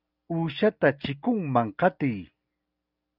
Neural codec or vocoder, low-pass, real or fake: none; 5.4 kHz; real